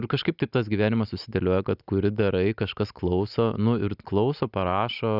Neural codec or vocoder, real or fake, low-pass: none; real; 5.4 kHz